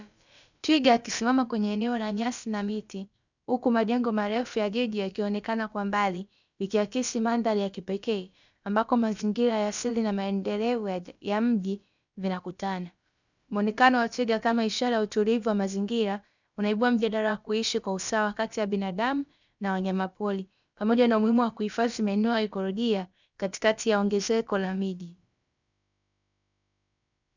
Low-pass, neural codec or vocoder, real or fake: 7.2 kHz; codec, 16 kHz, about 1 kbps, DyCAST, with the encoder's durations; fake